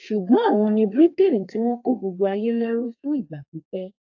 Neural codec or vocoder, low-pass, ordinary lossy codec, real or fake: codec, 32 kHz, 1.9 kbps, SNAC; 7.2 kHz; AAC, 48 kbps; fake